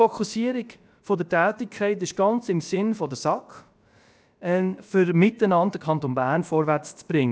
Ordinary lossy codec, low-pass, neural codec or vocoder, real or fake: none; none; codec, 16 kHz, about 1 kbps, DyCAST, with the encoder's durations; fake